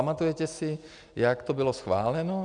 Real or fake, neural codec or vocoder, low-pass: real; none; 9.9 kHz